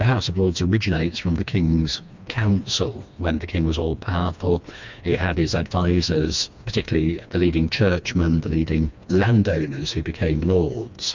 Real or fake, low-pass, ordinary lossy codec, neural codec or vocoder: fake; 7.2 kHz; MP3, 64 kbps; codec, 16 kHz, 2 kbps, FreqCodec, smaller model